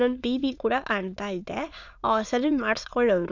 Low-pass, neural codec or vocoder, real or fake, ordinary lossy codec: 7.2 kHz; autoencoder, 22.05 kHz, a latent of 192 numbers a frame, VITS, trained on many speakers; fake; none